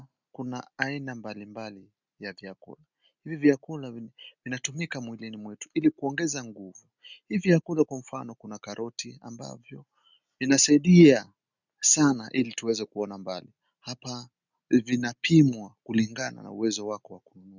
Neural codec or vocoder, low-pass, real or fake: none; 7.2 kHz; real